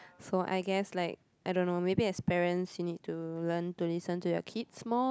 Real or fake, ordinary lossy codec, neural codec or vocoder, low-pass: real; none; none; none